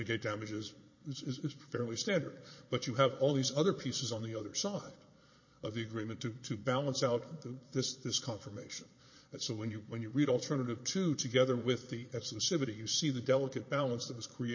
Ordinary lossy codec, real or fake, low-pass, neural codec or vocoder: MP3, 32 kbps; fake; 7.2 kHz; vocoder, 22.05 kHz, 80 mel bands, Vocos